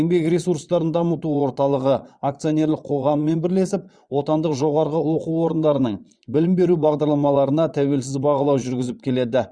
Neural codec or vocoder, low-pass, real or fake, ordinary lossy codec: vocoder, 22.05 kHz, 80 mel bands, Vocos; 9.9 kHz; fake; Opus, 64 kbps